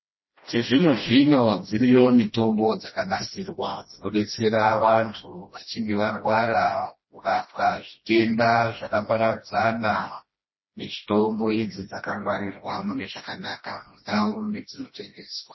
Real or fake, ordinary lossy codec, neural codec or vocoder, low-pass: fake; MP3, 24 kbps; codec, 16 kHz, 1 kbps, FreqCodec, smaller model; 7.2 kHz